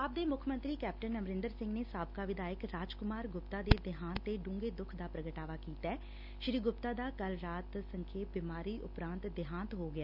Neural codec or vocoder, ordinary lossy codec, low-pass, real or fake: none; none; 5.4 kHz; real